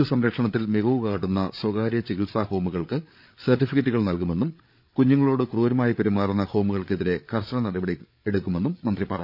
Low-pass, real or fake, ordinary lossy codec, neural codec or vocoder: 5.4 kHz; fake; none; codec, 16 kHz, 8 kbps, FreqCodec, larger model